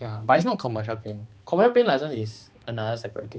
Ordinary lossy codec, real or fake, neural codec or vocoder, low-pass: none; fake; codec, 16 kHz, 2 kbps, X-Codec, HuBERT features, trained on balanced general audio; none